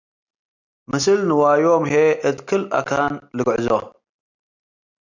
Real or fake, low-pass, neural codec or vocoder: real; 7.2 kHz; none